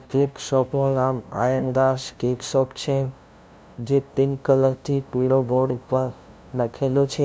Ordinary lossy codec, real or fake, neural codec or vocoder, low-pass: none; fake; codec, 16 kHz, 0.5 kbps, FunCodec, trained on LibriTTS, 25 frames a second; none